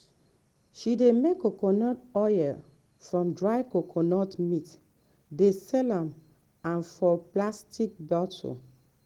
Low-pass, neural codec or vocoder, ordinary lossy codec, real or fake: 19.8 kHz; none; Opus, 16 kbps; real